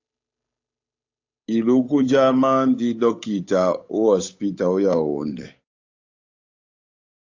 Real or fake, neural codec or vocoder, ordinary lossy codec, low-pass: fake; codec, 16 kHz, 8 kbps, FunCodec, trained on Chinese and English, 25 frames a second; AAC, 48 kbps; 7.2 kHz